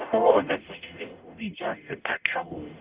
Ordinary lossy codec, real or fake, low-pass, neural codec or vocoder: Opus, 32 kbps; fake; 3.6 kHz; codec, 44.1 kHz, 0.9 kbps, DAC